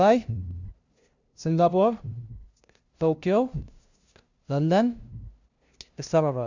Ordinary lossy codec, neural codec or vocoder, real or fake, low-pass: Opus, 64 kbps; codec, 16 kHz, 0.5 kbps, FunCodec, trained on LibriTTS, 25 frames a second; fake; 7.2 kHz